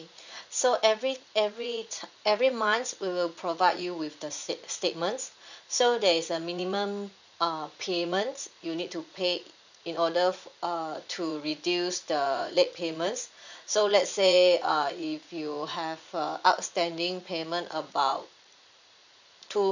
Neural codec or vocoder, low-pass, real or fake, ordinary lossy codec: vocoder, 44.1 kHz, 80 mel bands, Vocos; 7.2 kHz; fake; none